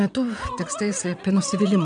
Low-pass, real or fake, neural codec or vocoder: 9.9 kHz; fake; vocoder, 22.05 kHz, 80 mel bands, WaveNeXt